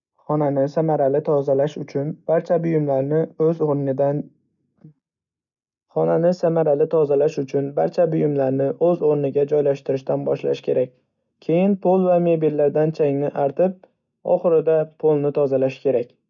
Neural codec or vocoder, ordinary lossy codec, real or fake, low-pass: none; none; real; 7.2 kHz